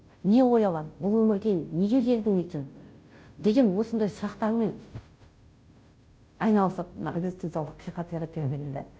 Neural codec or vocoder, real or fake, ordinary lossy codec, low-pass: codec, 16 kHz, 0.5 kbps, FunCodec, trained on Chinese and English, 25 frames a second; fake; none; none